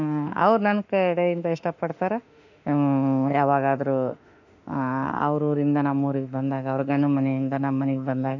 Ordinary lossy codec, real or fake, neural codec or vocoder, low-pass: none; fake; autoencoder, 48 kHz, 32 numbers a frame, DAC-VAE, trained on Japanese speech; 7.2 kHz